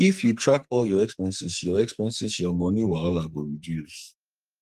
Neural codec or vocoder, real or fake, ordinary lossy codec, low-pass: codec, 44.1 kHz, 2.6 kbps, SNAC; fake; Opus, 32 kbps; 14.4 kHz